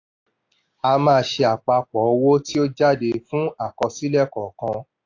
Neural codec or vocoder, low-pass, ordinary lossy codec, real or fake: none; 7.2 kHz; AAC, 48 kbps; real